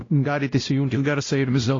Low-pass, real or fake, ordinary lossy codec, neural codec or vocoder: 7.2 kHz; fake; AAC, 32 kbps; codec, 16 kHz, 0.5 kbps, X-Codec, WavLM features, trained on Multilingual LibriSpeech